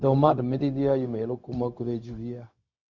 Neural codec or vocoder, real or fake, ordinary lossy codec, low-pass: codec, 16 kHz, 0.4 kbps, LongCat-Audio-Codec; fake; Opus, 64 kbps; 7.2 kHz